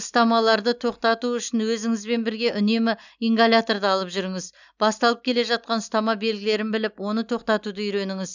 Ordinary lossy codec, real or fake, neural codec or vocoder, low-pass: none; real; none; 7.2 kHz